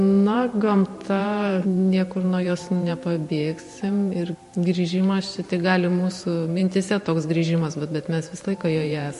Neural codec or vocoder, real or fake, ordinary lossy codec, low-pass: vocoder, 44.1 kHz, 128 mel bands every 512 samples, BigVGAN v2; fake; MP3, 48 kbps; 14.4 kHz